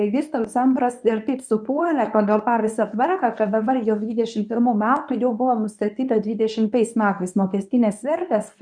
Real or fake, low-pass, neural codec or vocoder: fake; 9.9 kHz; codec, 24 kHz, 0.9 kbps, WavTokenizer, medium speech release version 1